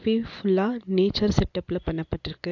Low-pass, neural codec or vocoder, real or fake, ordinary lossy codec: 7.2 kHz; none; real; AAC, 48 kbps